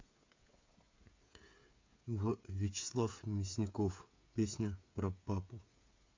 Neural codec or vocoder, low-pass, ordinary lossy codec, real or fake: codec, 16 kHz, 8 kbps, FreqCodec, smaller model; 7.2 kHz; MP3, 48 kbps; fake